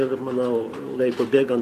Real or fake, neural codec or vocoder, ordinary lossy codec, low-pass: fake; vocoder, 44.1 kHz, 128 mel bands every 512 samples, BigVGAN v2; AAC, 64 kbps; 14.4 kHz